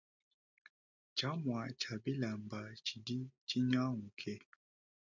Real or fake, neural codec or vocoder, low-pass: real; none; 7.2 kHz